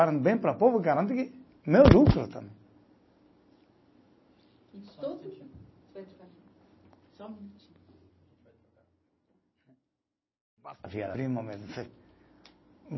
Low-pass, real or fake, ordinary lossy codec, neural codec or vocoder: 7.2 kHz; real; MP3, 24 kbps; none